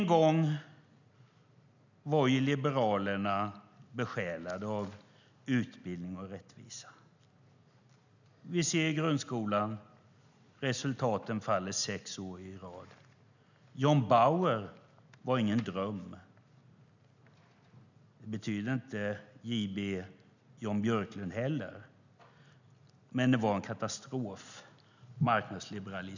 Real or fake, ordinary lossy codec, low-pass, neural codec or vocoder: real; none; 7.2 kHz; none